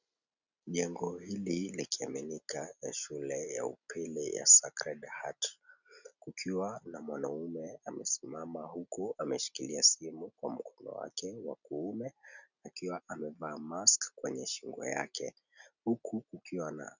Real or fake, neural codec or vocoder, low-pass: real; none; 7.2 kHz